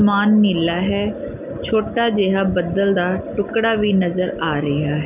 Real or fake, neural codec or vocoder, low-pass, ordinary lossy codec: real; none; 3.6 kHz; none